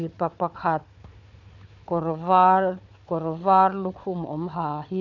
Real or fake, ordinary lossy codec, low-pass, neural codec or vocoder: fake; none; 7.2 kHz; codec, 16 kHz, 4 kbps, FunCodec, trained on Chinese and English, 50 frames a second